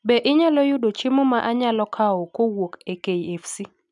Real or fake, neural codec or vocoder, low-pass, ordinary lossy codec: real; none; 10.8 kHz; none